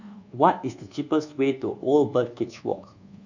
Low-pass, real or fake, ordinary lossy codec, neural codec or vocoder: 7.2 kHz; fake; none; codec, 24 kHz, 1.2 kbps, DualCodec